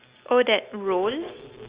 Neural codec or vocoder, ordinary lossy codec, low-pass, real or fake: none; Opus, 64 kbps; 3.6 kHz; real